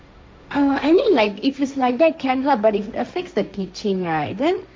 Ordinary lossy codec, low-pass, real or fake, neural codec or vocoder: none; none; fake; codec, 16 kHz, 1.1 kbps, Voila-Tokenizer